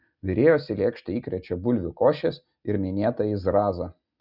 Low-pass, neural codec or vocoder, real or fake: 5.4 kHz; none; real